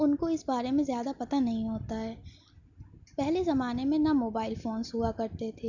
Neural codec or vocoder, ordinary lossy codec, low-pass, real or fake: none; none; 7.2 kHz; real